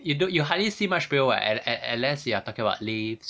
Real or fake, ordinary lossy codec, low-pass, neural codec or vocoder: real; none; none; none